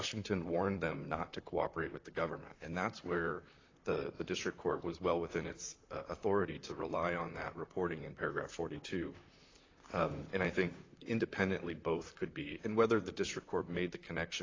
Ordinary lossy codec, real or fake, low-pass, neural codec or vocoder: AAC, 32 kbps; fake; 7.2 kHz; vocoder, 44.1 kHz, 128 mel bands, Pupu-Vocoder